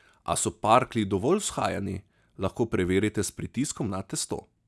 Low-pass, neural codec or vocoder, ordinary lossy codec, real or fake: none; none; none; real